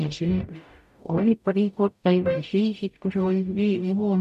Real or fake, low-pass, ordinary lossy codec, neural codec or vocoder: fake; 14.4 kHz; none; codec, 44.1 kHz, 0.9 kbps, DAC